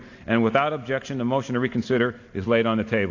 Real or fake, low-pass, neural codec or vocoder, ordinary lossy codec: real; 7.2 kHz; none; AAC, 48 kbps